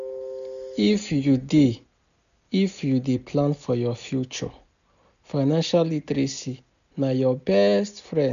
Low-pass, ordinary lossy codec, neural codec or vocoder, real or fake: 7.2 kHz; none; none; real